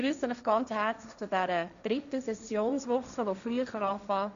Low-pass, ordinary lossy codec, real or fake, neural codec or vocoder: 7.2 kHz; none; fake; codec, 16 kHz, 1.1 kbps, Voila-Tokenizer